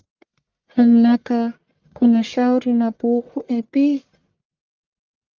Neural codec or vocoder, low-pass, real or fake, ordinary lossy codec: codec, 44.1 kHz, 1.7 kbps, Pupu-Codec; 7.2 kHz; fake; Opus, 24 kbps